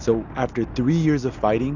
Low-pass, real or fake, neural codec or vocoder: 7.2 kHz; real; none